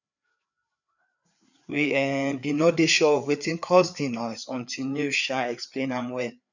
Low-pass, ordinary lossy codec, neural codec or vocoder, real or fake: 7.2 kHz; none; codec, 16 kHz, 4 kbps, FreqCodec, larger model; fake